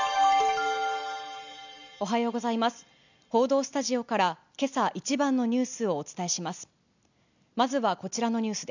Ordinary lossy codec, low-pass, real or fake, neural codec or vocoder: none; 7.2 kHz; real; none